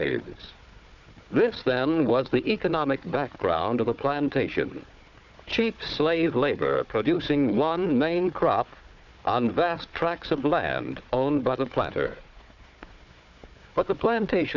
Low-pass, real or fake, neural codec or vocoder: 7.2 kHz; fake; codec, 16 kHz, 4 kbps, FunCodec, trained on Chinese and English, 50 frames a second